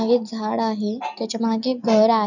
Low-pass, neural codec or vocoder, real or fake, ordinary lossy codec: 7.2 kHz; none; real; none